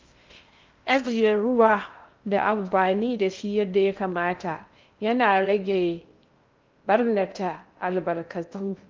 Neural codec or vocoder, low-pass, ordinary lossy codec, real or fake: codec, 16 kHz in and 24 kHz out, 0.6 kbps, FocalCodec, streaming, 2048 codes; 7.2 kHz; Opus, 24 kbps; fake